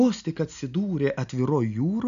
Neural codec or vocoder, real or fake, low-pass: none; real; 7.2 kHz